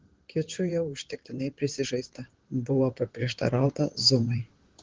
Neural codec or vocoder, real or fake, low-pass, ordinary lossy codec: vocoder, 44.1 kHz, 128 mel bands, Pupu-Vocoder; fake; 7.2 kHz; Opus, 16 kbps